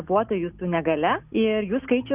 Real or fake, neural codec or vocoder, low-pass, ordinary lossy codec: real; none; 3.6 kHz; Opus, 64 kbps